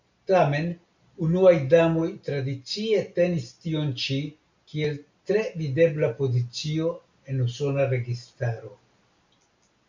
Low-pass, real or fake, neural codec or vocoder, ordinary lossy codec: 7.2 kHz; real; none; MP3, 64 kbps